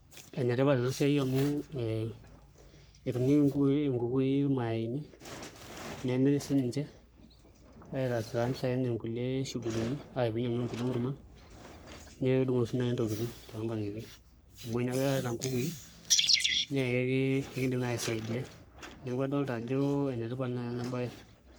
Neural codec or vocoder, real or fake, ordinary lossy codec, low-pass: codec, 44.1 kHz, 3.4 kbps, Pupu-Codec; fake; none; none